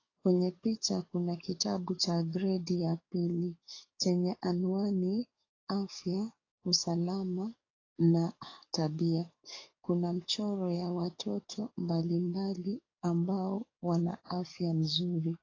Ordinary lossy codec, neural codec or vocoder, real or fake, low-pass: AAC, 32 kbps; codec, 44.1 kHz, 7.8 kbps, DAC; fake; 7.2 kHz